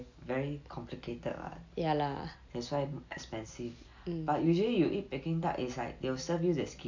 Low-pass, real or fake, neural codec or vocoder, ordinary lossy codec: 7.2 kHz; real; none; AAC, 48 kbps